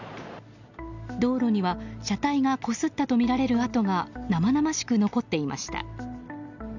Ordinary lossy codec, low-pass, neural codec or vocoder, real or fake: none; 7.2 kHz; none; real